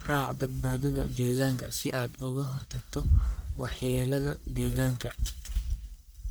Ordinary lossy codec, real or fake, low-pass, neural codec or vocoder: none; fake; none; codec, 44.1 kHz, 1.7 kbps, Pupu-Codec